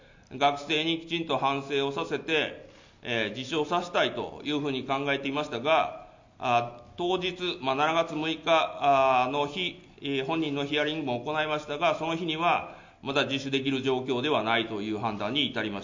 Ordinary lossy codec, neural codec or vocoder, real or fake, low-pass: MP3, 64 kbps; none; real; 7.2 kHz